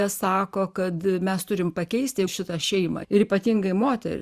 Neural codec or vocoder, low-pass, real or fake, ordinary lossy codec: vocoder, 44.1 kHz, 128 mel bands every 512 samples, BigVGAN v2; 14.4 kHz; fake; Opus, 64 kbps